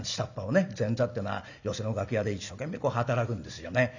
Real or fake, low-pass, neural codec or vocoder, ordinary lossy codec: real; 7.2 kHz; none; none